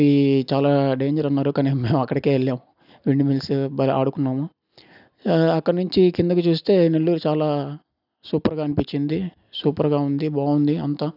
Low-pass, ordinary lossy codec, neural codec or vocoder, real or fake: 5.4 kHz; none; none; real